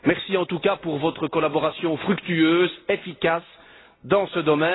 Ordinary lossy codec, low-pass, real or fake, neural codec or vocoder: AAC, 16 kbps; 7.2 kHz; real; none